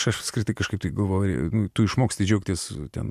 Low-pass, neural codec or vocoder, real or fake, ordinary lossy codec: 14.4 kHz; vocoder, 44.1 kHz, 128 mel bands every 256 samples, BigVGAN v2; fake; MP3, 96 kbps